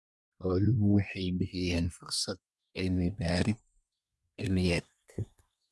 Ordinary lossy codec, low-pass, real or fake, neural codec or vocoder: none; none; fake; codec, 24 kHz, 1 kbps, SNAC